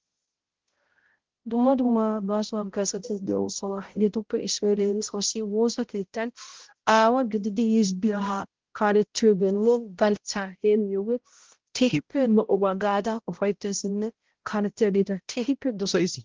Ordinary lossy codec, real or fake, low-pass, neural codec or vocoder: Opus, 16 kbps; fake; 7.2 kHz; codec, 16 kHz, 0.5 kbps, X-Codec, HuBERT features, trained on balanced general audio